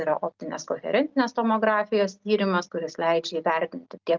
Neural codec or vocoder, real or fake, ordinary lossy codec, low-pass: none; real; Opus, 24 kbps; 7.2 kHz